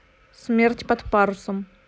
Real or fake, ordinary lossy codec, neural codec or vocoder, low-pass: real; none; none; none